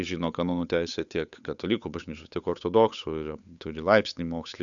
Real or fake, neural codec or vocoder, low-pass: fake; codec, 16 kHz, 8 kbps, FunCodec, trained on Chinese and English, 25 frames a second; 7.2 kHz